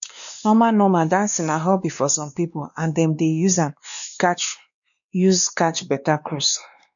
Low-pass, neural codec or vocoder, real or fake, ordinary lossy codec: 7.2 kHz; codec, 16 kHz, 2 kbps, X-Codec, WavLM features, trained on Multilingual LibriSpeech; fake; none